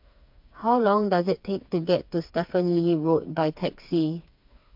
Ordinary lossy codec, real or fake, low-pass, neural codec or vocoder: MP3, 48 kbps; fake; 5.4 kHz; codec, 16 kHz, 4 kbps, FreqCodec, smaller model